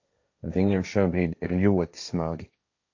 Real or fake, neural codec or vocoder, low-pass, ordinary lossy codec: fake; codec, 16 kHz, 1.1 kbps, Voila-Tokenizer; 7.2 kHz; AAC, 48 kbps